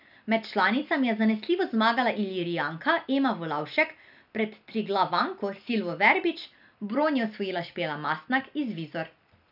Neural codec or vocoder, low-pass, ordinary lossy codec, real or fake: none; 5.4 kHz; none; real